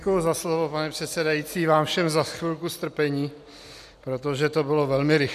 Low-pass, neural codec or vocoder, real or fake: 14.4 kHz; none; real